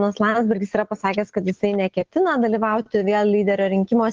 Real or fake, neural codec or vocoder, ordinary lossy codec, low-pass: real; none; Opus, 16 kbps; 7.2 kHz